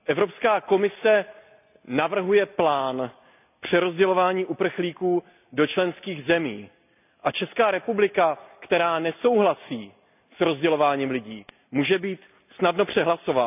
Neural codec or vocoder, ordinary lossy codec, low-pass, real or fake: none; none; 3.6 kHz; real